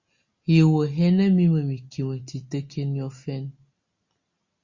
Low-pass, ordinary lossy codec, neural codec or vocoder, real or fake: 7.2 kHz; Opus, 64 kbps; none; real